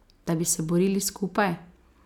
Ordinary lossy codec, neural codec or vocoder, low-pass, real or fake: none; none; 19.8 kHz; real